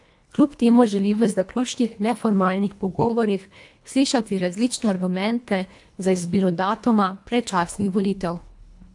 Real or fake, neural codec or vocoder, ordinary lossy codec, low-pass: fake; codec, 24 kHz, 1.5 kbps, HILCodec; AAC, 64 kbps; 10.8 kHz